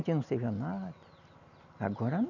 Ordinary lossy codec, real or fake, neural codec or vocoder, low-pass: none; real; none; 7.2 kHz